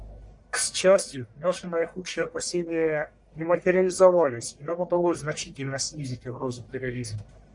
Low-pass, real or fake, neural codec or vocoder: 10.8 kHz; fake; codec, 44.1 kHz, 1.7 kbps, Pupu-Codec